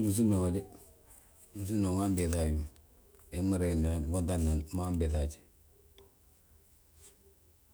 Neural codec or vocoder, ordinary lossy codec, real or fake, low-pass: autoencoder, 48 kHz, 128 numbers a frame, DAC-VAE, trained on Japanese speech; none; fake; none